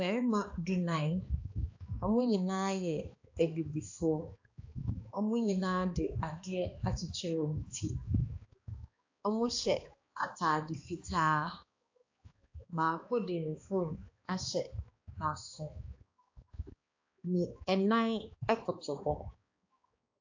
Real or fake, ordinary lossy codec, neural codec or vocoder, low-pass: fake; AAC, 48 kbps; codec, 16 kHz, 2 kbps, X-Codec, HuBERT features, trained on balanced general audio; 7.2 kHz